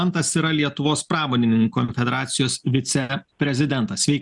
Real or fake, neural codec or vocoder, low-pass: real; none; 10.8 kHz